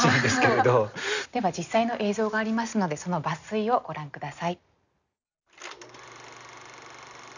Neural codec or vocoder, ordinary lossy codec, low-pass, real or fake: none; none; 7.2 kHz; real